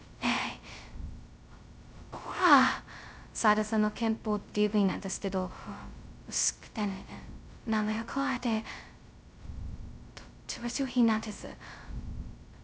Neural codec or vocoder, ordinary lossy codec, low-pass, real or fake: codec, 16 kHz, 0.2 kbps, FocalCodec; none; none; fake